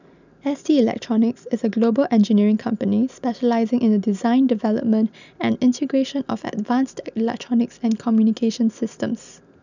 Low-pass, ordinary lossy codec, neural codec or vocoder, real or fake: 7.2 kHz; none; none; real